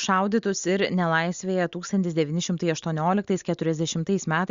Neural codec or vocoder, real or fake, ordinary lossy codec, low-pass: none; real; Opus, 64 kbps; 7.2 kHz